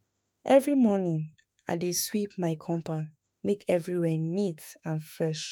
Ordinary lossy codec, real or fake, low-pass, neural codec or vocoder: none; fake; none; autoencoder, 48 kHz, 32 numbers a frame, DAC-VAE, trained on Japanese speech